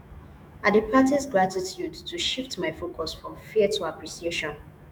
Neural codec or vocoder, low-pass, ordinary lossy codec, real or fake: autoencoder, 48 kHz, 128 numbers a frame, DAC-VAE, trained on Japanese speech; none; none; fake